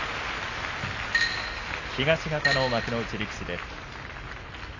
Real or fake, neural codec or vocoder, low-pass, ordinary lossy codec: real; none; 7.2 kHz; AAC, 32 kbps